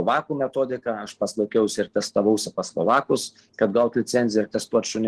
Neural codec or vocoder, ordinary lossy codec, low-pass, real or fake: none; Opus, 16 kbps; 10.8 kHz; real